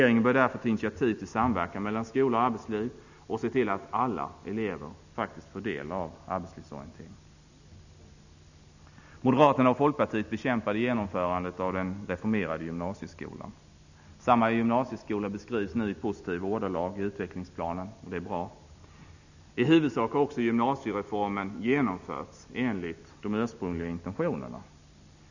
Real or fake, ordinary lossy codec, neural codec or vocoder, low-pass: real; none; none; 7.2 kHz